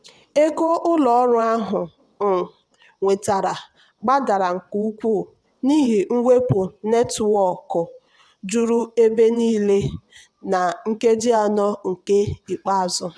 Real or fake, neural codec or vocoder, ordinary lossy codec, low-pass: fake; vocoder, 22.05 kHz, 80 mel bands, WaveNeXt; none; none